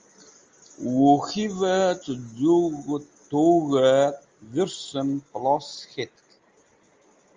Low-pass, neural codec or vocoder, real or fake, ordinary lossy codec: 7.2 kHz; none; real; Opus, 32 kbps